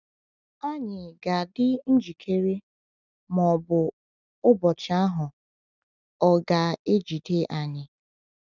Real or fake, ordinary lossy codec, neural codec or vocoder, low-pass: real; none; none; 7.2 kHz